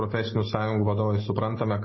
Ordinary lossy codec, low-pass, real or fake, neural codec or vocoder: MP3, 24 kbps; 7.2 kHz; real; none